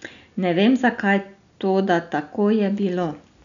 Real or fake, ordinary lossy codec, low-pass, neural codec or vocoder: real; none; 7.2 kHz; none